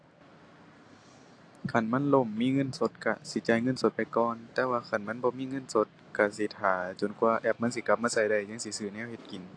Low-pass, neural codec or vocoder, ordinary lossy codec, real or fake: 9.9 kHz; none; AAC, 48 kbps; real